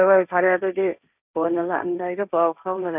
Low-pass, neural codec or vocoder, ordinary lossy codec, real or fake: 3.6 kHz; codec, 16 kHz, 1.1 kbps, Voila-Tokenizer; none; fake